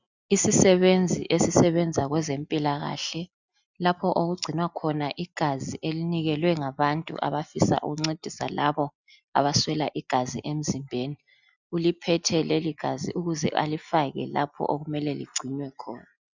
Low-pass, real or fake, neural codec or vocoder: 7.2 kHz; real; none